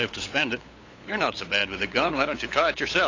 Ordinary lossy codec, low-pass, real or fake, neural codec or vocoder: AAC, 32 kbps; 7.2 kHz; fake; codec, 16 kHz, 8 kbps, FunCodec, trained on LibriTTS, 25 frames a second